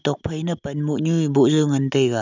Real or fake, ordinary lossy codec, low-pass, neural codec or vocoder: real; none; 7.2 kHz; none